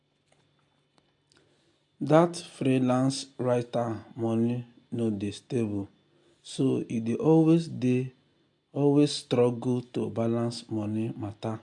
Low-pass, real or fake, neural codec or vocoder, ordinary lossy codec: 10.8 kHz; real; none; AAC, 64 kbps